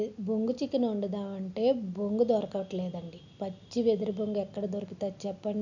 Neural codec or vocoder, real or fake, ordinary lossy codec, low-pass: none; real; none; 7.2 kHz